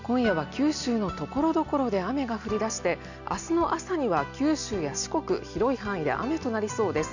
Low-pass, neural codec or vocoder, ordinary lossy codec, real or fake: 7.2 kHz; none; none; real